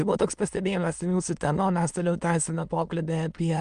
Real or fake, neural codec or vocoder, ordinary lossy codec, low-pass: fake; autoencoder, 22.05 kHz, a latent of 192 numbers a frame, VITS, trained on many speakers; Opus, 32 kbps; 9.9 kHz